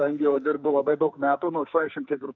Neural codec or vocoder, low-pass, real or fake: codec, 44.1 kHz, 2.6 kbps, SNAC; 7.2 kHz; fake